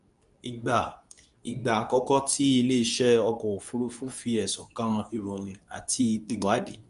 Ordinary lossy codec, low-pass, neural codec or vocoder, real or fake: none; 10.8 kHz; codec, 24 kHz, 0.9 kbps, WavTokenizer, medium speech release version 2; fake